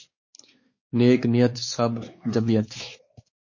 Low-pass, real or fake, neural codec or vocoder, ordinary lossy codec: 7.2 kHz; fake; codec, 16 kHz, 4 kbps, X-Codec, WavLM features, trained on Multilingual LibriSpeech; MP3, 32 kbps